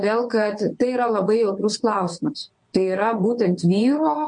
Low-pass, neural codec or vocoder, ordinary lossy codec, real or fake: 9.9 kHz; vocoder, 22.05 kHz, 80 mel bands, WaveNeXt; MP3, 48 kbps; fake